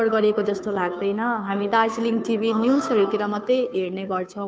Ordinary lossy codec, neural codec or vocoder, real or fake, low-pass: none; codec, 16 kHz, 2 kbps, FunCodec, trained on Chinese and English, 25 frames a second; fake; none